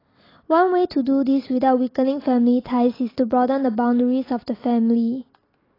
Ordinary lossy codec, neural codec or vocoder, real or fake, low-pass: AAC, 24 kbps; none; real; 5.4 kHz